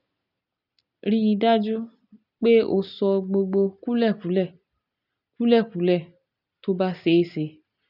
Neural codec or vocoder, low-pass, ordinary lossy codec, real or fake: none; 5.4 kHz; none; real